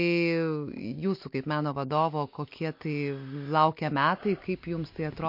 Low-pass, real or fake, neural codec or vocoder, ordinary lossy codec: 5.4 kHz; real; none; MP3, 32 kbps